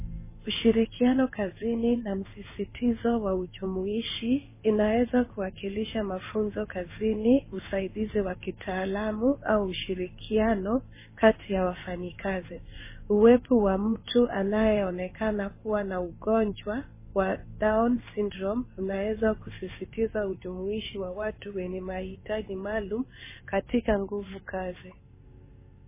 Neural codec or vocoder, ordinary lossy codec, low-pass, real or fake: codec, 16 kHz in and 24 kHz out, 1 kbps, XY-Tokenizer; MP3, 16 kbps; 3.6 kHz; fake